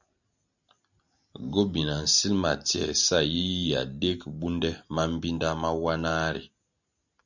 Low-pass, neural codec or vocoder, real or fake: 7.2 kHz; none; real